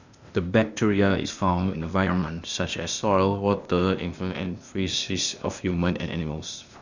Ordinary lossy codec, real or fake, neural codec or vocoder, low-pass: none; fake; codec, 16 kHz, 0.8 kbps, ZipCodec; 7.2 kHz